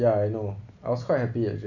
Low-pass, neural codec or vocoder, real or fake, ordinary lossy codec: 7.2 kHz; none; real; none